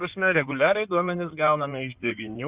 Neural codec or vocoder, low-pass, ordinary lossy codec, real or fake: codec, 16 kHz in and 24 kHz out, 2.2 kbps, FireRedTTS-2 codec; 3.6 kHz; Opus, 64 kbps; fake